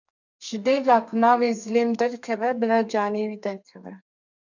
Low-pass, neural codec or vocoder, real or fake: 7.2 kHz; codec, 32 kHz, 1.9 kbps, SNAC; fake